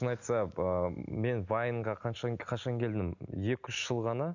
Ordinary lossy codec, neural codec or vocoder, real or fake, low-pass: none; none; real; 7.2 kHz